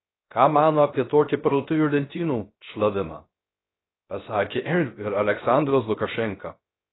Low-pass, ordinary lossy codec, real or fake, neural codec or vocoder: 7.2 kHz; AAC, 16 kbps; fake; codec, 16 kHz, 0.3 kbps, FocalCodec